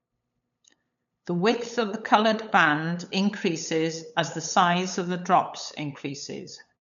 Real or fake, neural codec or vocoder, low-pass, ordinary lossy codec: fake; codec, 16 kHz, 8 kbps, FunCodec, trained on LibriTTS, 25 frames a second; 7.2 kHz; none